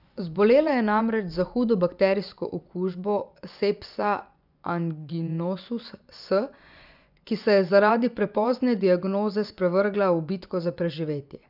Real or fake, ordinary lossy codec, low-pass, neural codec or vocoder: fake; none; 5.4 kHz; vocoder, 24 kHz, 100 mel bands, Vocos